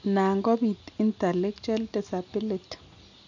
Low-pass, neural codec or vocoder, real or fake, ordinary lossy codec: 7.2 kHz; none; real; none